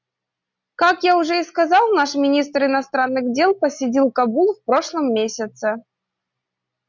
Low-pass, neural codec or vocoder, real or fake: 7.2 kHz; none; real